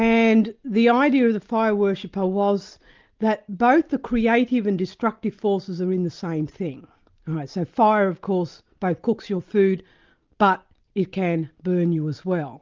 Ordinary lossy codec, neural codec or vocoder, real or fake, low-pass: Opus, 32 kbps; none; real; 7.2 kHz